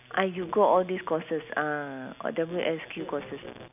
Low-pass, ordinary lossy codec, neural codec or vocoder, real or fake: 3.6 kHz; none; none; real